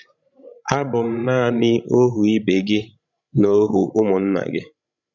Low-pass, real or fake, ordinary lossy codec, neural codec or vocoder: 7.2 kHz; fake; none; codec, 16 kHz, 16 kbps, FreqCodec, larger model